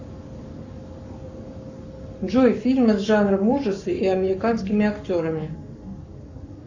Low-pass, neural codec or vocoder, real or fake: 7.2 kHz; codec, 44.1 kHz, 7.8 kbps, Pupu-Codec; fake